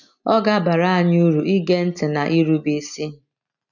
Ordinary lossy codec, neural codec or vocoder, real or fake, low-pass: none; none; real; 7.2 kHz